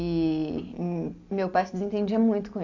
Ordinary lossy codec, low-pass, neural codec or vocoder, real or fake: AAC, 48 kbps; 7.2 kHz; none; real